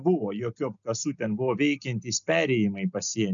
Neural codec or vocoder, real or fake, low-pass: none; real; 7.2 kHz